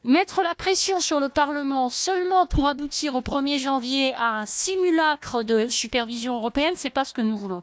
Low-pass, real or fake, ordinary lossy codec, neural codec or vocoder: none; fake; none; codec, 16 kHz, 1 kbps, FunCodec, trained on Chinese and English, 50 frames a second